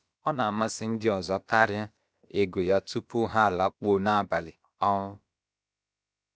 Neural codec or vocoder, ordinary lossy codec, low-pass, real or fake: codec, 16 kHz, about 1 kbps, DyCAST, with the encoder's durations; none; none; fake